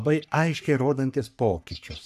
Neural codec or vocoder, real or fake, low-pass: codec, 44.1 kHz, 3.4 kbps, Pupu-Codec; fake; 14.4 kHz